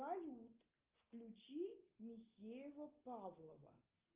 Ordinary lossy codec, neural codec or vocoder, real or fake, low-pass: Opus, 32 kbps; none; real; 3.6 kHz